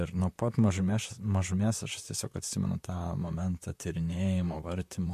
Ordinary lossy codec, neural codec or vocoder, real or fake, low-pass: MP3, 64 kbps; vocoder, 44.1 kHz, 128 mel bands, Pupu-Vocoder; fake; 14.4 kHz